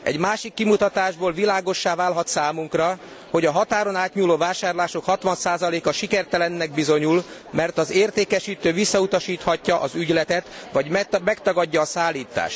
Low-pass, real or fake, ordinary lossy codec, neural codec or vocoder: none; real; none; none